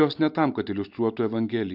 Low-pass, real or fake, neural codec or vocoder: 5.4 kHz; real; none